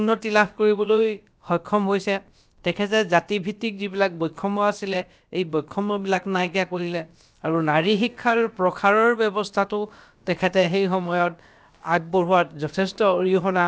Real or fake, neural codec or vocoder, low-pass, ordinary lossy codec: fake; codec, 16 kHz, about 1 kbps, DyCAST, with the encoder's durations; none; none